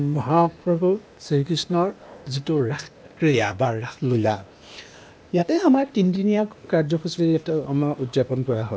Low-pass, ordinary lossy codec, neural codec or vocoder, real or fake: none; none; codec, 16 kHz, 0.8 kbps, ZipCodec; fake